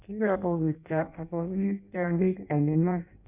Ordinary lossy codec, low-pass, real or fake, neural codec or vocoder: none; 3.6 kHz; fake; codec, 16 kHz in and 24 kHz out, 0.6 kbps, FireRedTTS-2 codec